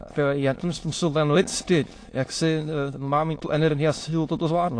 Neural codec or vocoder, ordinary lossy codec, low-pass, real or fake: autoencoder, 22.05 kHz, a latent of 192 numbers a frame, VITS, trained on many speakers; AAC, 48 kbps; 9.9 kHz; fake